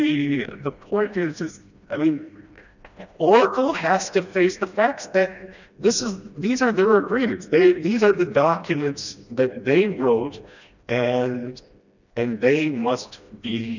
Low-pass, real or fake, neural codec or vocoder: 7.2 kHz; fake; codec, 16 kHz, 1 kbps, FreqCodec, smaller model